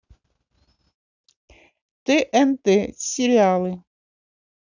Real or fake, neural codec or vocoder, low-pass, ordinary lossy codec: real; none; 7.2 kHz; none